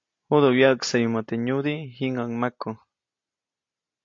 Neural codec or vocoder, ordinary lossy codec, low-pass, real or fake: none; AAC, 48 kbps; 7.2 kHz; real